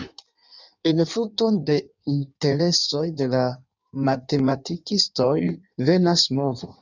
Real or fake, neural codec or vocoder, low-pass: fake; codec, 16 kHz in and 24 kHz out, 1.1 kbps, FireRedTTS-2 codec; 7.2 kHz